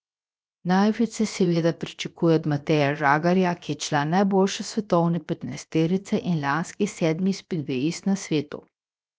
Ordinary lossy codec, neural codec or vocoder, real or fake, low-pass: none; codec, 16 kHz, 0.7 kbps, FocalCodec; fake; none